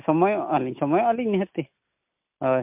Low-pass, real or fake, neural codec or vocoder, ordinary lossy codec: 3.6 kHz; real; none; MP3, 32 kbps